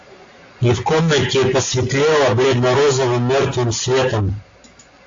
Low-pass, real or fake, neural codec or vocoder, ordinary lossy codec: 7.2 kHz; real; none; AAC, 48 kbps